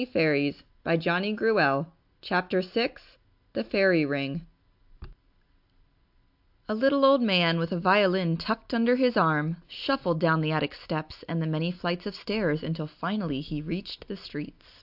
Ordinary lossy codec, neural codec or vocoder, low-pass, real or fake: AAC, 48 kbps; none; 5.4 kHz; real